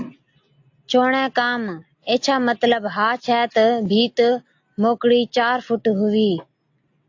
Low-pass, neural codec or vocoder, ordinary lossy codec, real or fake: 7.2 kHz; none; AAC, 48 kbps; real